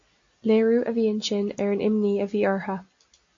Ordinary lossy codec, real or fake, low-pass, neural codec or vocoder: MP3, 48 kbps; real; 7.2 kHz; none